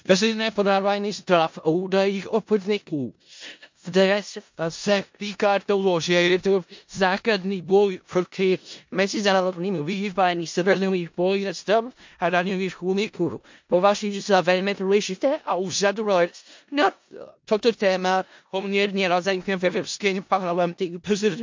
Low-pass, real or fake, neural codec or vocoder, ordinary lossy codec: 7.2 kHz; fake; codec, 16 kHz in and 24 kHz out, 0.4 kbps, LongCat-Audio-Codec, four codebook decoder; MP3, 48 kbps